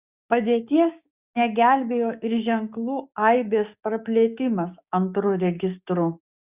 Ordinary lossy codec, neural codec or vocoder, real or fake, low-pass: Opus, 64 kbps; codec, 16 kHz, 6 kbps, DAC; fake; 3.6 kHz